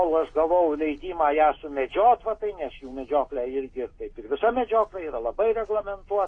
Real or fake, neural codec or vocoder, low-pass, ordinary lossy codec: real; none; 9.9 kHz; AAC, 32 kbps